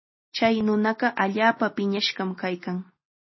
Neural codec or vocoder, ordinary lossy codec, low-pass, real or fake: none; MP3, 24 kbps; 7.2 kHz; real